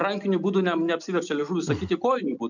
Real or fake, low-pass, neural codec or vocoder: real; 7.2 kHz; none